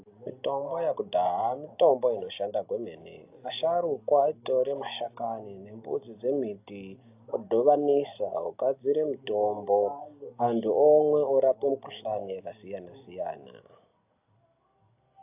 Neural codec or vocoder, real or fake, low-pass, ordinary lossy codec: none; real; 3.6 kHz; AAC, 32 kbps